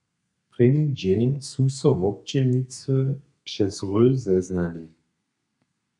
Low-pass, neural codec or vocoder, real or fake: 10.8 kHz; codec, 32 kHz, 1.9 kbps, SNAC; fake